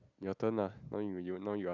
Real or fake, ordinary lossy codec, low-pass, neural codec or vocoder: real; none; 7.2 kHz; none